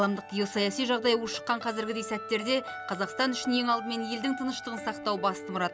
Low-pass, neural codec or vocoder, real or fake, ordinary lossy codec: none; none; real; none